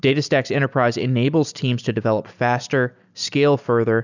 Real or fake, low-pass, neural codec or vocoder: real; 7.2 kHz; none